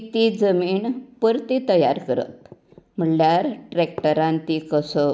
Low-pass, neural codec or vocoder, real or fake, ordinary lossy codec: none; none; real; none